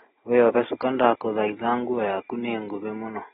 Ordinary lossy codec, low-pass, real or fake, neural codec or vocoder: AAC, 16 kbps; 14.4 kHz; real; none